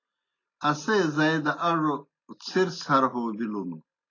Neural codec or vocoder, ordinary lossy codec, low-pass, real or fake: none; AAC, 32 kbps; 7.2 kHz; real